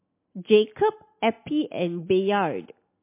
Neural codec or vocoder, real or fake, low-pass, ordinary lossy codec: none; real; 3.6 kHz; MP3, 24 kbps